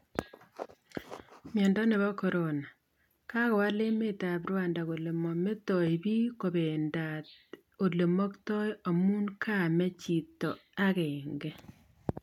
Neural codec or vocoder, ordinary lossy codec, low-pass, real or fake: none; none; 19.8 kHz; real